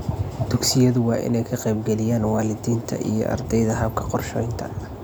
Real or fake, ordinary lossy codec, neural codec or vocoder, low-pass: real; none; none; none